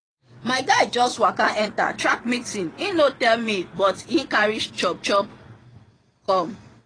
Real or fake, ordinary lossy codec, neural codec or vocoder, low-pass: fake; AAC, 32 kbps; vocoder, 22.05 kHz, 80 mel bands, WaveNeXt; 9.9 kHz